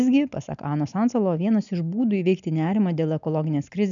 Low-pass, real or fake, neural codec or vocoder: 7.2 kHz; real; none